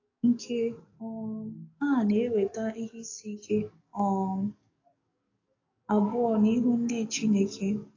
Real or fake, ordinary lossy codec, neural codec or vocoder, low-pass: fake; AAC, 32 kbps; codec, 44.1 kHz, 7.8 kbps, DAC; 7.2 kHz